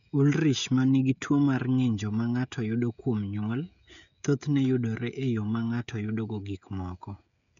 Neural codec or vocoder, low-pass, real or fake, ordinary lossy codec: codec, 16 kHz, 16 kbps, FreqCodec, smaller model; 7.2 kHz; fake; none